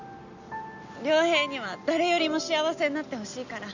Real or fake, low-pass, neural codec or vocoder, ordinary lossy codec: real; 7.2 kHz; none; none